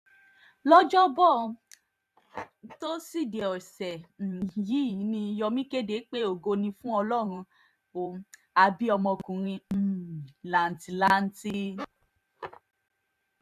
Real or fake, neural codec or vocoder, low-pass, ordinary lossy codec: fake; vocoder, 44.1 kHz, 128 mel bands every 512 samples, BigVGAN v2; 14.4 kHz; none